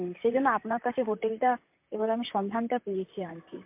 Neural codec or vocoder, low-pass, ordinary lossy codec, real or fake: vocoder, 44.1 kHz, 128 mel bands, Pupu-Vocoder; 3.6 kHz; MP3, 32 kbps; fake